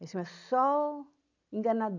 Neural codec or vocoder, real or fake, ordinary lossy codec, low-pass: none; real; none; 7.2 kHz